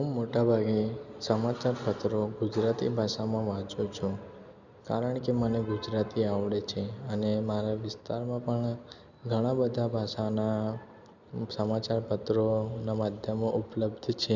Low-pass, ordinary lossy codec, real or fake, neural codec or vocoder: 7.2 kHz; none; real; none